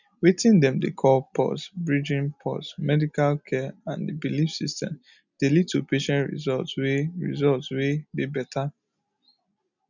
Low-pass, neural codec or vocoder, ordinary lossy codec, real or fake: 7.2 kHz; none; none; real